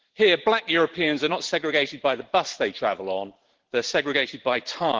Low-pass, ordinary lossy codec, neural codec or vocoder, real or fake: 7.2 kHz; Opus, 16 kbps; vocoder, 44.1 kHz, 128 mel bands every 512 samples, BigVGAN v2; fake